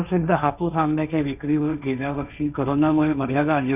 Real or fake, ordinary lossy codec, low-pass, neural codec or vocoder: fake; none; 3.6 kHz; codec, 16 kHz, 1.1 kbps, Voila-Tokenizer